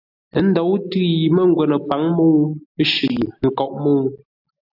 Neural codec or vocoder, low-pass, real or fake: none; 5.4 kHz; real